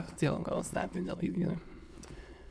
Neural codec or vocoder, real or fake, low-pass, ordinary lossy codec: autoencoder, 22.05 kHz, a latent of 192 numbers a frame, VITS, trained on many speakers; fake; none; none